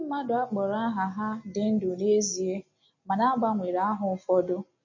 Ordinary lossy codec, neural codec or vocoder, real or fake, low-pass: MP3, 32 kbps; none; real; 7.2 kHz